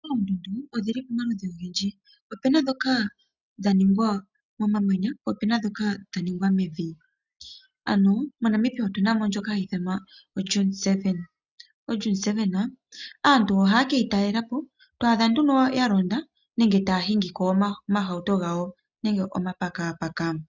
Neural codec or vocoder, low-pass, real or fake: none; 7.2 kHz; real